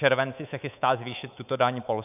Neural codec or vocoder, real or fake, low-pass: codec, 24 kHz, 3.1 kbps, DualCodec; fake; 3.6 kHz